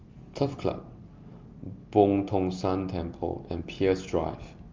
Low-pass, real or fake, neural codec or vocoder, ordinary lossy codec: 7.2 kHz; real; none; Opus, 32 kbps